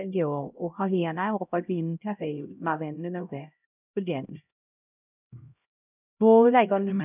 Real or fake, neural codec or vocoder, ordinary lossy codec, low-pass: fake; codec, 16 kHz, 0.5 kbps, X-Codec, HuBERT features, trained on LibriSpeech; none; 3.6 kHz